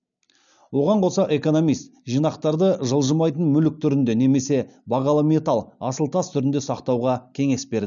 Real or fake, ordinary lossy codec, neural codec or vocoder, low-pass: real; none; none; 7.2 kHz